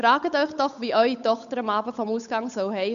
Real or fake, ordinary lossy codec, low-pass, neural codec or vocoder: fake; MP3, 96 kbps; 7.2 kHz; codec, 16 kHz, 8 kbps, FunCodec, trained on Chinese and English, 25 frames a second